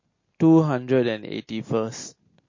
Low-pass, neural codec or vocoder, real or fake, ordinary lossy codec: 7.2 kHz; none; real; MP3, 32 kbps